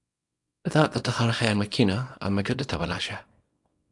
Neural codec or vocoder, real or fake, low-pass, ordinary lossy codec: codec, 24 kHz, 0.9 kbps, WavTokenizer, small release; fake; 10.8 kHz; AAC, 64 kbps